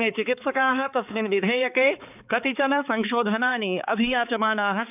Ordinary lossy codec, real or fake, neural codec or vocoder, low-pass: none; fake; codec, 16 kHz, 4 kbps, X-Codec, HuBERT features, trained on balanced general audio; 3.6 kHz